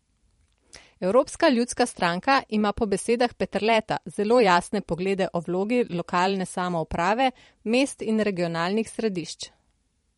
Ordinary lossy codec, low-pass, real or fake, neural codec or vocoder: MP3, 48 kbps; 19.8 kHz; fake; vocoder, 44.1 kHz, 128 mel bands every 512 samples, BigVGAN v2